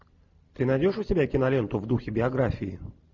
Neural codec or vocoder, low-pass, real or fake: none; 7.2 kHz; real